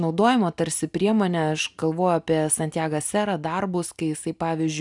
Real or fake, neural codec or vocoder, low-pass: real; none; 10.8 kHz